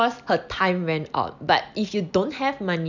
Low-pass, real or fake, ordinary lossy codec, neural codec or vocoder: 7.2 kHz; real; none; none